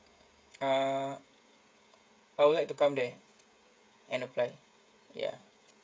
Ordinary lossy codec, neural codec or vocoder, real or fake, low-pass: none; codec, 16 kHz, 16 kbps, FreqCodec, smaller model; fake; none